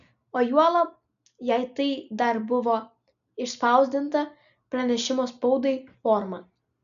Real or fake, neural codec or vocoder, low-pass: real; none; 7.2 kHz